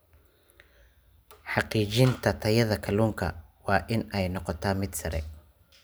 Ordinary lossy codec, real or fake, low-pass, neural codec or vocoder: none; real; none; none